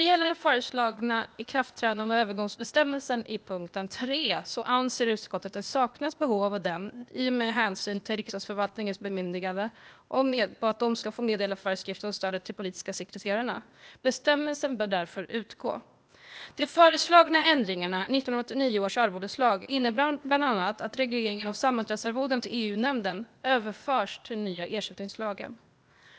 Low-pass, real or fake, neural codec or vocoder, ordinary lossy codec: none; fake; codec, 16 kHz, 0.8 kbps, ZipCodec; none